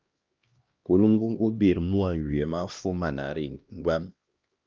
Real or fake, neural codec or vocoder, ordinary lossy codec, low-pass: fake; codec, 16 kHz, 1 kbps, X-Codec, HuBERT features, trained on LibriSpeech; Opus, 32 kbps; 7.2 kHz